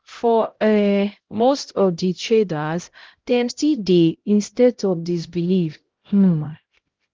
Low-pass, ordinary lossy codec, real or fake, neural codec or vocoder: 7.2 kHz; Opus, 16 kbps; fake; codec, 16 kHz, 0.5 kbps, X-Codec, HuBERT features, trained on LibriSpeech